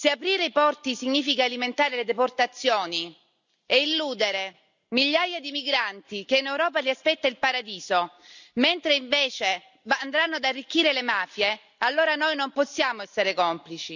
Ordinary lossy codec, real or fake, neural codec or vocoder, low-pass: none; real; none; 7.2 kHz